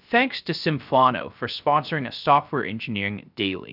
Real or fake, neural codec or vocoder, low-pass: fake; codec, 16 kHz, 0.3 kbps, FocalCodec; 5.4 kHz